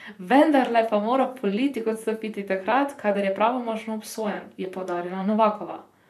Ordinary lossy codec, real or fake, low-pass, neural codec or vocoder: none; fake; 14.4 kHz; vocoder, 44.1 kHz, 128 mel bands, Pupu-Vocoder